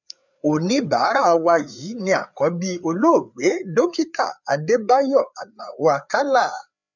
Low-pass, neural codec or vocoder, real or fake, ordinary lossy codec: 7.2 kHz; codec, 16 kHz, 4 kbps, FreqCodec, larger model; fake; none